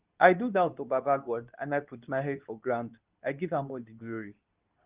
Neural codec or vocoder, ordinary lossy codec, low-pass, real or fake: codec, 24 kHz, 0.9 kbps, WavTokenizer, medium speech release version 2; Opus, 24 kbps; 3.6 kHz; fake